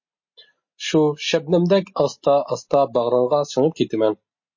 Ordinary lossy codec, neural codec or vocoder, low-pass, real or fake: MP3, 32 kbps; none; 7.2 kHz; real